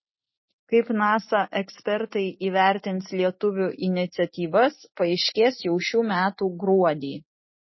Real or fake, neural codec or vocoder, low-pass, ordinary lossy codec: real; none; 7.2 kHz; MP3, 24 kbps